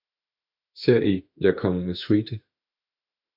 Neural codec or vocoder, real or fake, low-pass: autoencoder, 48 kHz, 32 numbers a frame, DAC-VAE, trained on Japanese speech; fake; 5.4 kHz